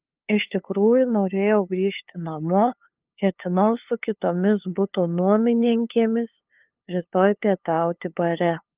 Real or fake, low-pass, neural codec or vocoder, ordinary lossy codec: fake; 3.6 kHz; codec, 16 kHz, 2 kbps, FunCodec, trained on LibriTTS, 25 frames a second; Opus, 32 kbps